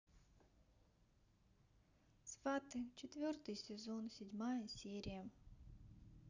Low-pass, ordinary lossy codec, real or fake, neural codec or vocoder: 7.2 kHz; none; real; none